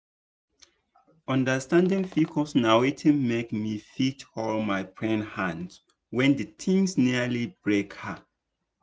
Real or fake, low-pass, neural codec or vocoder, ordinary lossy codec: real; none; none; none